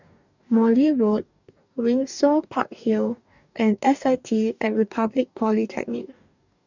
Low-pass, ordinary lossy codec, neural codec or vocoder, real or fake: 7.2 kHz; none; codec, 44.1 kHz, 2.6 kbps, DAC; fake